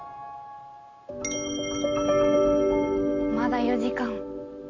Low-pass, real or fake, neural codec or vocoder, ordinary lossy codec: 7.2 kHz; real; none; none